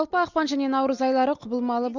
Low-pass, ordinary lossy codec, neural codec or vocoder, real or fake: 7.2 kHz; none; none; real